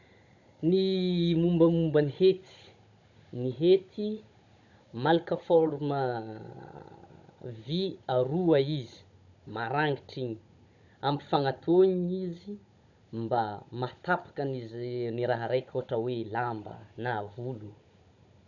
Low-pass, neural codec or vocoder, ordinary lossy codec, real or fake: 7.2 kHz; codec, 16 kHz, 16 kbps, FunCodec, trained on Chinese and English, 50 frames a second; none; fake